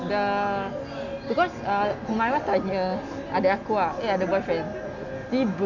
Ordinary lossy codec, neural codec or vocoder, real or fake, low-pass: none; none; real; 7.2 kHz